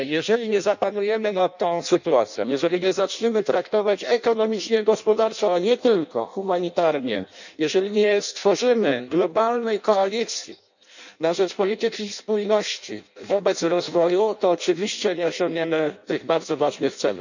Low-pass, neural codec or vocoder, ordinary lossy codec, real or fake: 7.2 kHz; codec, 16 kHz in and 24 kHz out, 0.6 kbps, FireRedTTS-2 codec; none; fake